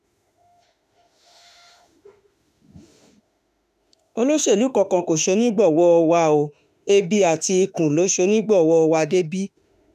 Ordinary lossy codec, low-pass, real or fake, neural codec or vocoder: none; 14.4 kHz; fake; autoencoder, 48 kHz, 32 numbers a frame, DAC-VAE, trained on Japanese speech